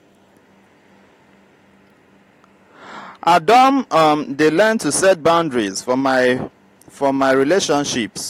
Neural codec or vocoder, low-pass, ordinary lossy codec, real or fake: none; 19.8 kHz; AAC, 48 kbps; real